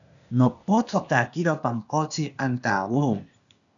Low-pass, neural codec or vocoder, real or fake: 7.2 kHz; codec, 16 kHz, 0.8 kbps, ZipCodec; fake